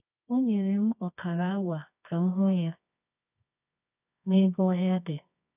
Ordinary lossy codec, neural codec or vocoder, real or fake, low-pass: none; codec, 24 kHz, 0.9 kbps, WavTokenizer, medium music audio release; fake; 3.6 kHz